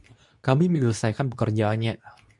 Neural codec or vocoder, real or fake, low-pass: codec, 24 kHz, 0.9 kbps, WavTokenizer, medium speech release version 2; fake; 10.8 kHz